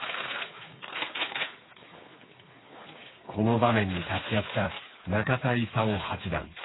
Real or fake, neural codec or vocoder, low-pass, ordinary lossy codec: fake; codec, 16 kHz, 4 kbps, FreqCodec, smaller model; 7.2 kHz; AAC, 16 kbps